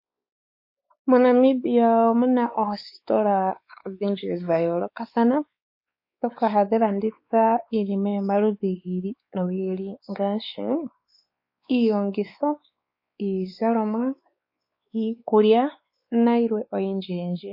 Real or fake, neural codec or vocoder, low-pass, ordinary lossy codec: fake; codec, 16 kHz, 4 kbps, X-Codec, WavLM features, trained on Multilingual LibriSpeech; 5.4 kHz; MP3, 32 kbps